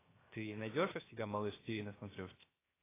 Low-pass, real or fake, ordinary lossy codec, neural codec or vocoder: 3.6 kHz; fake; AAC, 16 kbps; codec, 16 kHz, 0.7 kbps, FocalCodec